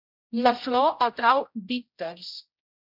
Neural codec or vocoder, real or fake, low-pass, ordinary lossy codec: codec, 16 kHz, 0.5 kbps, X-Codec, HuBERT features, trained on general audio; fake; 5.4 kHz; MP3, 48 kbps